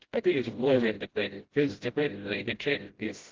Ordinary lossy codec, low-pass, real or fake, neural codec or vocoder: Opus, 16 kbps; 7.2 kHz; fake; codec, 16 kHz, 0.5 kbps, FreqCodec, smaller model